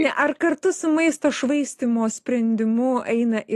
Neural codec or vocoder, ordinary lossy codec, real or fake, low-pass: none; AAC, 48 kbps; real; 14.4 kHz